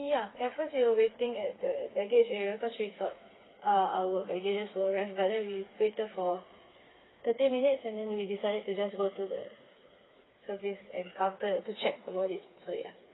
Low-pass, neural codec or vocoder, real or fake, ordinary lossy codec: 7.2 kHz; codec, 16 kHz, 4 kbps, FreqCodec, smaller model; fake; AAC, 16 kbps